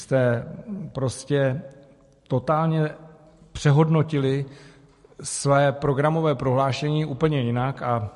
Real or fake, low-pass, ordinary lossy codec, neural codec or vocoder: fake; 14.4 kHz; MP3, 48 kbps; vocoder, 44.1 kHz, 128 mel bands every 256 samples, BigVGAN v2